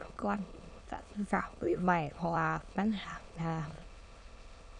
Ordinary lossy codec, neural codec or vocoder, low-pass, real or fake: none; autoencoder, 22.05 kHz, a latent of 192 numbers a frame, VITS, trained on many speakers; 9.9 kHz; fake